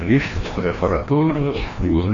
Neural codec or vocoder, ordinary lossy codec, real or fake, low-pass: codec, 16 kHz, 1 kbps, FreqCodec, larger model; AAC, 32 kbps; fake; 7.2 kHz